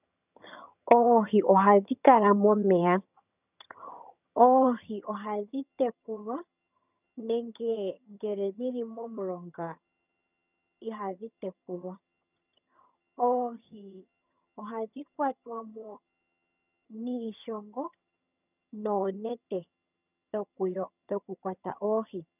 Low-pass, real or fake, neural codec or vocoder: 3.6 kHz; fake; vocoder, 22.05 kHz, 80 mel bands, HiFi-GAN